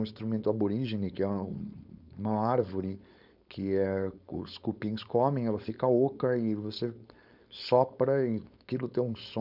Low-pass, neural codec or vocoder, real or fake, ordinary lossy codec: 5.4 kHz; codec, 16 kHz, 4.8 kbps, FACodec; fake; none